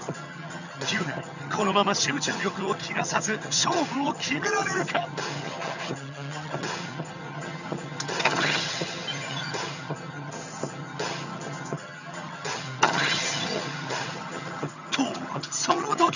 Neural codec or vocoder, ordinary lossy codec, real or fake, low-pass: vocoder, 22.05 kHz, 80 mel bands, HiFi-GAN; none; fake; 7.2 kHz